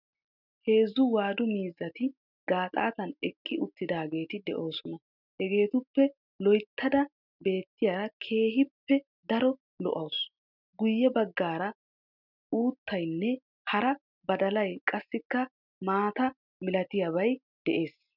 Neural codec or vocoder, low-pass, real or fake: none; 5.4 kHz; real